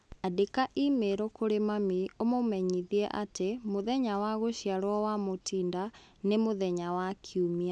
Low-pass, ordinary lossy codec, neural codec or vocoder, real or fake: none; none; none; real